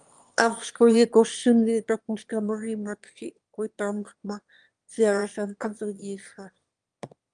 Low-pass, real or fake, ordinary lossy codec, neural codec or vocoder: 9.9 kHz; fake; Opus, 32 kbps; autoencoder, 22.05 kHz, a latent of 192 numbers a frame, VITS, trained on one speaker